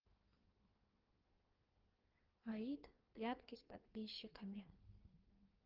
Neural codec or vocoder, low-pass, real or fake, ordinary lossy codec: codec, 16 kHz in and 24 kHz out, 1.1 kbps, FireRedTTS-2 codec; 5.4 kHz; fake; Opus, 16 kbps